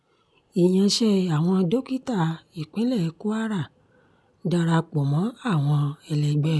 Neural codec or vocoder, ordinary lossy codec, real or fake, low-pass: none; none; real; none